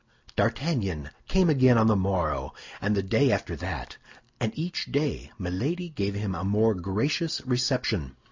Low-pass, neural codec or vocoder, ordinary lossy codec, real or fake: 7.2 kHz; none; MP3, 64 kbps; real